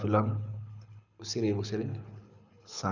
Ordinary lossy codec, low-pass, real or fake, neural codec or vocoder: none; 7.2 kHz; fake; codec, 24 kHz, 6 kbps, HILCodec